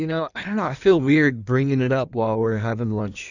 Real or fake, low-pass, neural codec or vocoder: fake; 7.2 kHz; codec, 16 kHz in and 24 kHz out, 1.1 kbps, FireRedTTS-2 codec